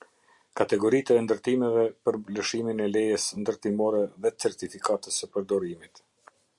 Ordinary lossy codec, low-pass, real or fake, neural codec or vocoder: Opus, 64 kbps; 10.8 kHz; real; none